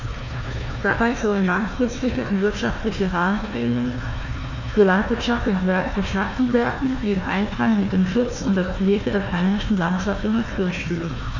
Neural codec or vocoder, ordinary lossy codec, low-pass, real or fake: codec, 16 kHz, 1 kbps, FunCodec, trained on Chinese and English, 50 frames a second; none; 7.2 kHz; fake